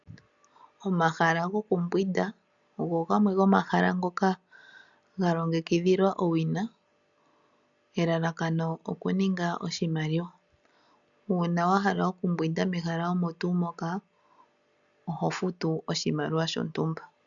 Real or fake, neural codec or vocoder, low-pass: real; none; 7.2 kHz